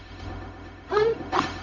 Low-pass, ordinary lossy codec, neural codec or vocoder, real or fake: 7.2 kHz; none; codec, 16 kHz, 0.4 kbps, LongCat-Audio-Codec; fake